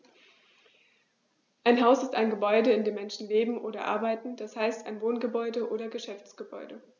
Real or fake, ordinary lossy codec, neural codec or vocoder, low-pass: real; none; none; 7.2 kHz